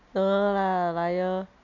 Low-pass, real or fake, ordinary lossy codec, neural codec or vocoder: 7.2 kHz; real; none; none